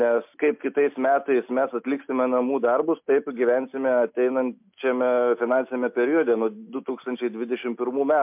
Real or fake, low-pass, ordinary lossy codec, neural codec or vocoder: real; 3.6 kHz; MP3, 32 kbps; none